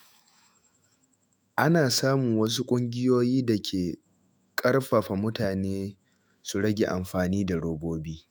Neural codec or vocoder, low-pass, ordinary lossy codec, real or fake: autoencoder, 48 kHz, 128 numbers a frame, DAC-VAE, trained on Japanese speech; none; none; fake